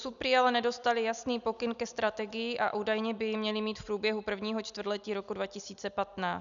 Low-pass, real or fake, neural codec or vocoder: 7.2 kHz; real; none